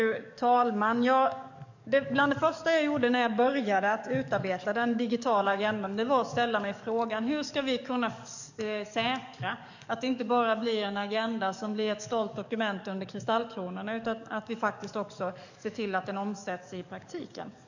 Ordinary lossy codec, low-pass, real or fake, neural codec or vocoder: none; 7.2 kHz; fake; codec, 44.1 kHz, 7.8 kbps, DAC